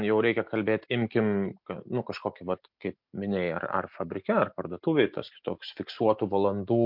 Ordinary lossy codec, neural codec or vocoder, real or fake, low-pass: MP3, 48 kbps; none; real; 5.4 kHz